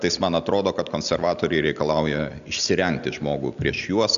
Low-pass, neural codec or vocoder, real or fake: 7.2 kHz; none; real